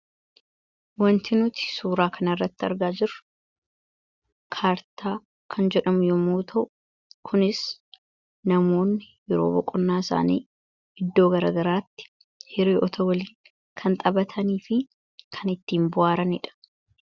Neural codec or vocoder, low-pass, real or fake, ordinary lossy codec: none; 7.2 kHz; real; Opus, 64 kbps